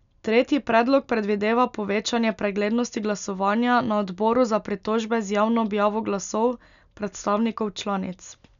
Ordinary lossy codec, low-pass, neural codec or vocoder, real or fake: none; 7.2 kHz; none; real